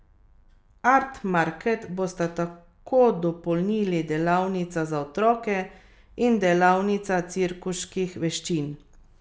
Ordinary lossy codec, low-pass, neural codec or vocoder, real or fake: none; none; none; real